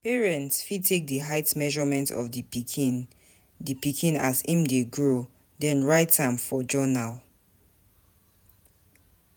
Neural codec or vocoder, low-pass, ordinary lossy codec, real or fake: vocoder, 48 kHz, 128 mel bands, Vocos; none; none; fake